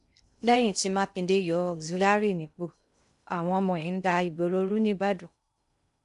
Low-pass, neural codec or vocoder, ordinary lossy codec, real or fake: 10.8 kHz; codec, 16 kHz in and 24 kHz out, 0.6 kbps, FocalCodec, streaming, 2048 codes; none; fake